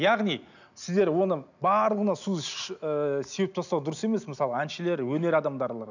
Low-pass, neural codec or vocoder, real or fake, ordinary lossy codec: 7.2 kHz; none; real; none